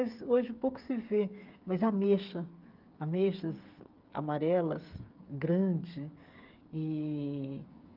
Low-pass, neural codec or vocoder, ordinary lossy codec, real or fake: 5.4 kHz; codec, 16 kHz, 8 kbps, FreqCodec, smaller model; Opus, 32 kbps; fake